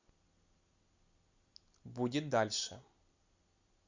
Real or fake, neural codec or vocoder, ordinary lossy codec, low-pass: real; none; Opus, 64 kbps; 7.2 kHz